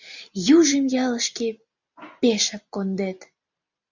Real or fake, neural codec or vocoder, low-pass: real; none; 7.2 kHz